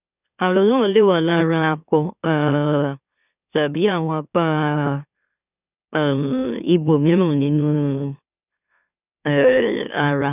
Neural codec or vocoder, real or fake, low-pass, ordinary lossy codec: autoencoder, 44.1 kHz, a latent of 192 numbers a frame, MeloTTS; fake; 3.6 kHz; none